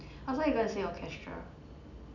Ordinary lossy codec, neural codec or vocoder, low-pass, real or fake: none; none; 7.2 kHz; real